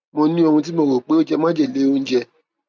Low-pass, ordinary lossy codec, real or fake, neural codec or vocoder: none; none; real; none